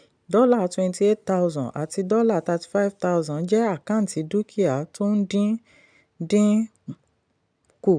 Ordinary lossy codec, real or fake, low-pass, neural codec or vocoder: none; real; 9.9 kHz; none